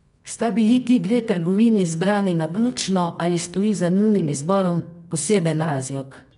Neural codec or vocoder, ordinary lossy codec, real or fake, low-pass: codec, 24 kHz, 0.9 kbps, WavTokenizer, medium music audio release; none; fake; 10.8 kHz